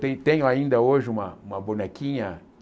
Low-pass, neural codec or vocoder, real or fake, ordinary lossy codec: none; none; real; none